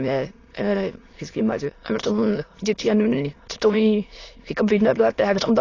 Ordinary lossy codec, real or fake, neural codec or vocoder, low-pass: AAC, 32 kbps; fake; autoencoder, 22.05 kHz, a latent of 192 numbers a frame, VITS, trained on many speakers; 7.2 kHz